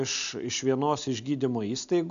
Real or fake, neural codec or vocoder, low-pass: real; none; 7.2 kHz